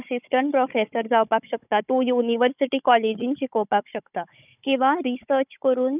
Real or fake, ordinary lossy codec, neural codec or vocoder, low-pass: fake; none; codec, 16 kHz, 16 kbps, FunCodec, trained on Chinese and English, 50 frames a second; 3.6 kHz